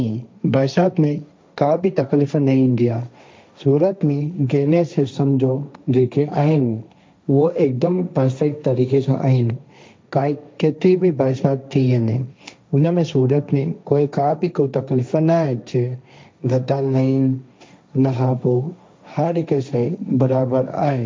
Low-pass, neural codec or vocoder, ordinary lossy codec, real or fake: none; codec, 16 kHz, 1.1 kbps, Voila-Tokenizer; none; fake